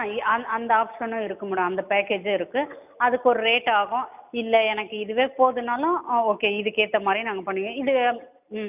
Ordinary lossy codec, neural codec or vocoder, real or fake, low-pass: none; none; real; 3.6 kHz